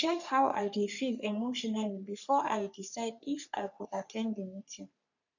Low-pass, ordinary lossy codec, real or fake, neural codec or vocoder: 7.2 kHz; none; fake; codec, 44.1 kHz, 3.4 kbps, Pupu-Codec